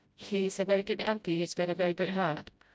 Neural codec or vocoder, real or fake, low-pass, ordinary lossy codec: codec, 16 kHz, 0.5 kbps, FreqCodec, smaller model; fake; none; none